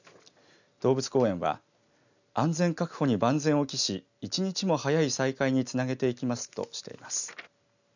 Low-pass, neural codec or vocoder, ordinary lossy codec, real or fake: 7.2 kHz; none; none; real